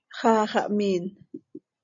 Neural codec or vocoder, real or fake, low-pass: none; real; 7.2 kHz